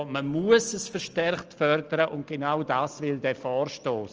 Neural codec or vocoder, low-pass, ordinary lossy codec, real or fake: none; 7.2 kHz; Opus, 24 kbps; real